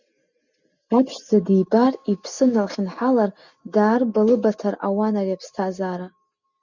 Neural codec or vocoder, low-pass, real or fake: none; 7.2 kHz; real